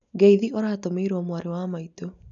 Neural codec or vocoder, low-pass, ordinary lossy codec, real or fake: none; 7.2 kHz; none; real